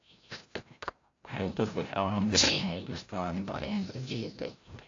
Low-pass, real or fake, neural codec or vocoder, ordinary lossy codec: 7.2 kHz; fake; codec, 16 kHz, 0.5 kbps, FreqCodec, larger model; AAC, 48 kbps